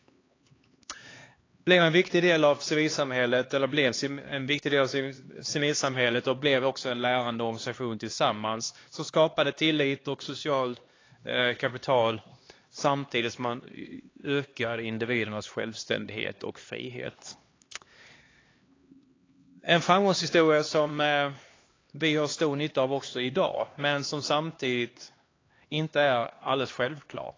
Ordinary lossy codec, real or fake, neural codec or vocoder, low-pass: AAC, 32 kbps; fake; codec, 16 kHz, 2 kbps, X-Codec, HuBERT features, trained on LibriSpeech; 7.2 kHz